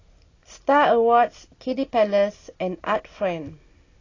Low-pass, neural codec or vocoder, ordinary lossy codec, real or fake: 7.2 kHz; none; AAC, 32 kbps; real